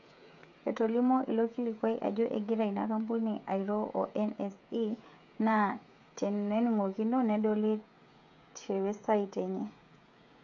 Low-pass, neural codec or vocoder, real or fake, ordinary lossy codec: 7.2 kHz; codec, 16 kHz, 16 kbps, FreqCodec, smaller model; fake; none